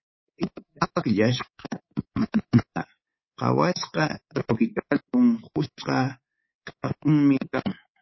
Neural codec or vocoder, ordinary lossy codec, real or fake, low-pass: codec, 24 kHz, 3.1 kbps, DualCodec; MP3, 24 kbps; fake; 7.2 kHz